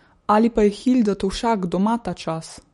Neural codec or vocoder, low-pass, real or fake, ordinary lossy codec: autoencoder, 48 kHz, 128 numbers a frame, DAC-VAE, trained on Japanese speech; 19.8 kHz; fake; MP3, 48 kbps